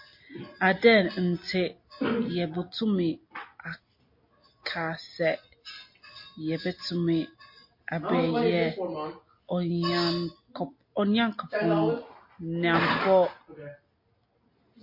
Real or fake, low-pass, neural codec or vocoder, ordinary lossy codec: real; 5.4 kHz; none; MP3, 32 kbps